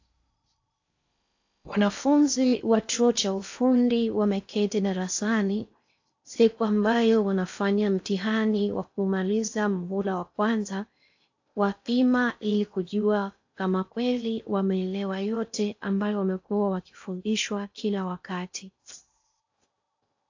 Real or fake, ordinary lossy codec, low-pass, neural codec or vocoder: fake; AAC, 48 kbps; 7.2 kHz; codec, 16 kHz in and 24 kHz out, 0.6 kbps, FocalCodec, streaming, 4096 codes